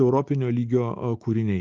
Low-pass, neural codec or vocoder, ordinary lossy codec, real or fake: 7.2 kHz; none; Opus, 32 kbps; real